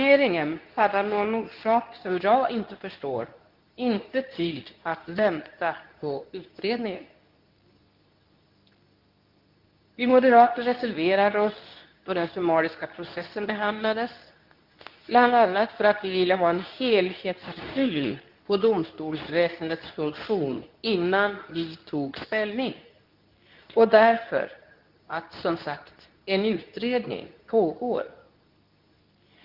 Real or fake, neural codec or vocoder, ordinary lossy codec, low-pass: fake; codec, 24 kHz, 0.9 kbps, WavTokenizer, medium speech release version 2; Opus, 32 kbps; 5.4 kHz